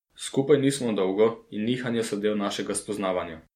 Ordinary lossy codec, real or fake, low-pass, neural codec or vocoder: MP3, 64 kbps; real; 19.8 kHz; none